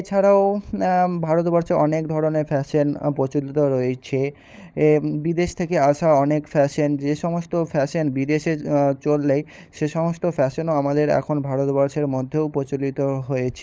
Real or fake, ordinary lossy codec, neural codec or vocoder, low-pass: fake; none; codec, 16 kHz, 16 kbps, FunCodec, trained on LibriTTS, 50 frames a second; none